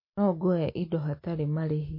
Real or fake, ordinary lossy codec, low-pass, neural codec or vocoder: real; MP3, 24 kbps; 5.4 kHz; none